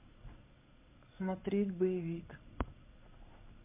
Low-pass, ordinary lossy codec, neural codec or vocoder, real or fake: 3.6 kHz; MP3, 32 kbps; codec, 16 kHz in and 24 kHz out, 1 kbps, XY-Tokenizer; fake